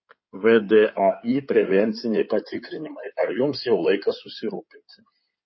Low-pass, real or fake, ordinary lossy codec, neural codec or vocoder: 7.2 kHz; fake; MP3, 24 kbps; codec, 16 kHz in and 24 kHz out, 2.2 kbps, FireRedTTS-2 codec